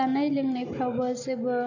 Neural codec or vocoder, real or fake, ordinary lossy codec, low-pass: none; real; none; 7.2 kHz